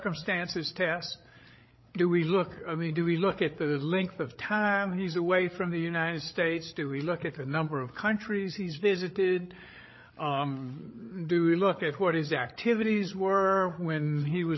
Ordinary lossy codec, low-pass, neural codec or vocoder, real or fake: MP3, 24 kbps; 7.2 kHz; codec, 16 kHz, 8 kbps, FreqCodec, larger model; fake